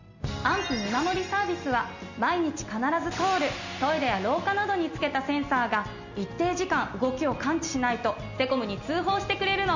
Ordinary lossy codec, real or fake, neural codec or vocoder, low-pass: none; real; none; 7.2 kHz